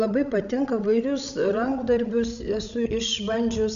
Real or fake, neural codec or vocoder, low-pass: fake; codec, 16 kHz, 16 kbps, FreqCodec, larger model; 7.2 kHz